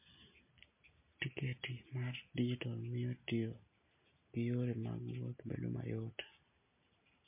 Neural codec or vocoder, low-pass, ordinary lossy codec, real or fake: none; 3.6 kHz; MP3, 24 kbps; real